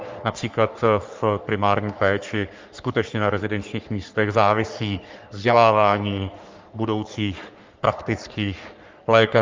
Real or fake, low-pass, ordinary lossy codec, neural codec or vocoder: fake; 7.2 kHz; Opus, 32 kbps; codec, 44.1 kHz, 3.4 kbps, Pupu-Codec